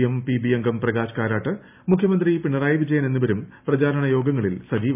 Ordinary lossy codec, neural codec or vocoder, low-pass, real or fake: none; none; 3.6 kHz; real